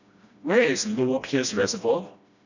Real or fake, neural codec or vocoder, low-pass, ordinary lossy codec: fake; codec, 16 kHz, 1 kbps, FreqCodec, smaller model; 7.2 kHz; none